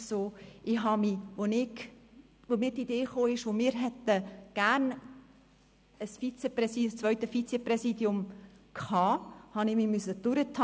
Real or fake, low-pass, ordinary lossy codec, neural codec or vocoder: real; none; none; none